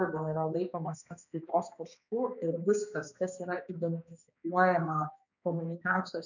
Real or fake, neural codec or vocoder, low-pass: fake; codec, 16 kHz, 2 kbps, X-Codec, HuBERT features, trained on balanced general audio; 7.2 kHz